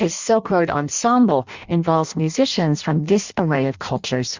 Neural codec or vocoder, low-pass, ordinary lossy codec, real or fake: codec, 16 kHz in and 24 kHz out, 0.6 kbps, FireRedTTS-2 codec; 7.2 kHz; Opus, 64 kbps; fake